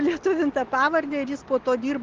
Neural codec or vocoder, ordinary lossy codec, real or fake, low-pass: none; Opus, 16 kbps; real; 7.2 kHz